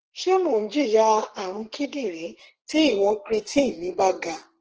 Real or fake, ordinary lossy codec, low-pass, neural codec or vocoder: fake; Opus, 16 kbps; 7.2 kHz; codec, 32 kHz, 1.9 kbps, SNAC